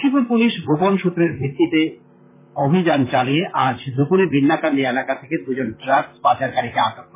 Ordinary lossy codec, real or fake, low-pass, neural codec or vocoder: MP3, 16 kbps; fake; 3.6 kHz; vocoder, 44.1 kHz, 128 mel bands, Pupu-Vocoder